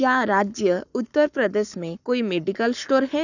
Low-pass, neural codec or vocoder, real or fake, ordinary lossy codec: 7.2 kHz; codec, 44.1 kHz, 7.8 kbps, Pupu-Codec; fake; none